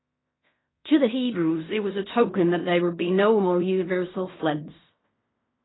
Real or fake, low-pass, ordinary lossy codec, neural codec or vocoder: fake; 7.2 kHz; AAC, 16 kbps; codec, 16 kHz in and 24 kHz out, 0.4 kbps, LongCat-Audio-Codec, fine tuned four codebook decoder